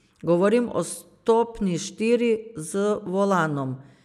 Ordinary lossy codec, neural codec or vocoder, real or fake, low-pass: none; none; real; 14.4 kHz